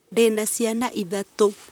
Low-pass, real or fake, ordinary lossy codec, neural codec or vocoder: none; fake; none; vocoder, 44.1 kHz, 128 mel bands, Pupu-Vocoder